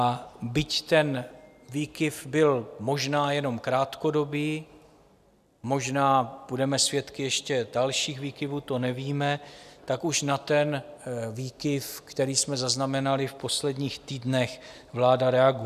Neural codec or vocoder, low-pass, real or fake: none; 14.4 kHz; real